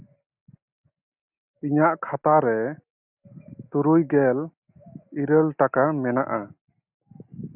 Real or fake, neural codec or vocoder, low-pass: real; none; 3.6 kHz